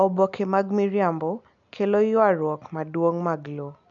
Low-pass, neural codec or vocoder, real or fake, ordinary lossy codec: 7.2 kHz; none; real; none